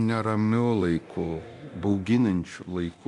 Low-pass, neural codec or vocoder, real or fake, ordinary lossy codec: 10.8 kHz; codec, 24 kHz, 0.9 kbps, DualCodec; fake; MP3, 64 kbps